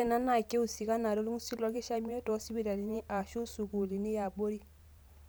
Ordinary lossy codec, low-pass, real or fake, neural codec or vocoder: none; none; fake; vocoder, 44.1 kHz, 128 mel bands every 512 samples, BigVGAN v2